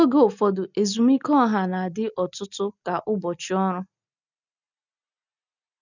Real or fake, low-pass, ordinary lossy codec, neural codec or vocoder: real; 7.2 kHz; none; none